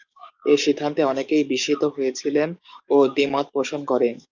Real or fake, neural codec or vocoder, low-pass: fake; codec, 44.1 kHz, 7.8 kbps, DAC; 7.2 kHz